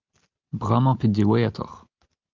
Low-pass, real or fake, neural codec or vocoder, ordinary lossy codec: 7.2 kHz; real; none; Opus, 24 kbps